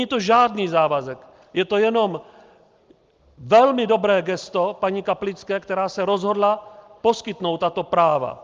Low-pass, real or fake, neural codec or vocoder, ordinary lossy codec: 7.2 kHz; real; none; Opus, 32 kbps